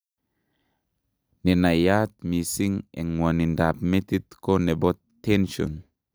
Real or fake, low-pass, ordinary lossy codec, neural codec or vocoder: real; none; none; none